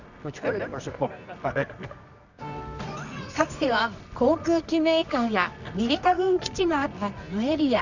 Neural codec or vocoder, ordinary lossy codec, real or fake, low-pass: codec, 24 kHz, 0.9 kbps, WavTokenizer, medium music audio release; none; fake; 7.2 kHz